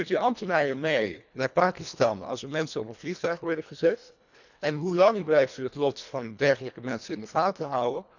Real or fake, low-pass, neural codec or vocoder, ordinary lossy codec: fake; 7.2 kHz; codec, 24 kHz, 1.5 kbps, HILCodec; none